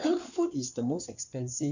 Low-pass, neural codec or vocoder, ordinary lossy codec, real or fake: 7.2 kHz; codec, 16 kHz in and 24 kHz out, 2.2 kbps, FireRedTTS-2 codec; none; fake